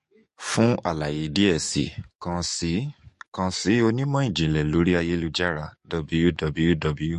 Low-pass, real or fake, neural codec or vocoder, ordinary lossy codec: 14.4 kHz; fake; codec, 44.1 kHz, 7.8 kbps, DAC; MP3, 48 kbps